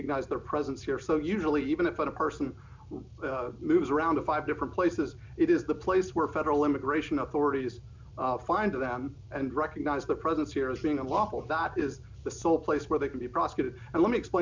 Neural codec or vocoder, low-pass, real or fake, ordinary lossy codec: none; 7.2 kHz; real; MP3, 64 kbps